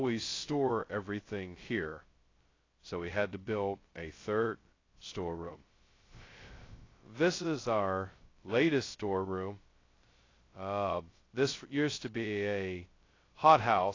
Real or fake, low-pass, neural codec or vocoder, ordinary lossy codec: fake; 7.2 kHz; codec, 16 kHz, 0.2 kbps, FocalCodec; AAC, 32 kbps